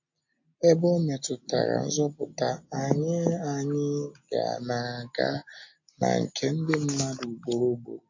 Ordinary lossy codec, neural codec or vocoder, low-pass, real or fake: MP3, 48 kbps; none; 7.2 kHz; real